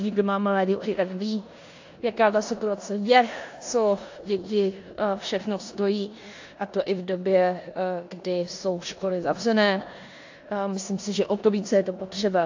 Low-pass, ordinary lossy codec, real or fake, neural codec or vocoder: 7.2 kHz; AAC, 48 kbps; fake; codec, 16 kHz in and 24 kHz out, 0.9 kbps, LongCat-Audio-Codec, four codebook decoder